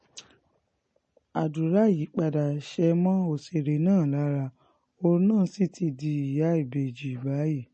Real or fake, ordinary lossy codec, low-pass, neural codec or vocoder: real; MP3, 32 kbps; 10.8 kHz; none